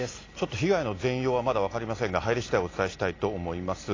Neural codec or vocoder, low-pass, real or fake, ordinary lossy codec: none; 7.2 kHz; real; AAC, 32 kbps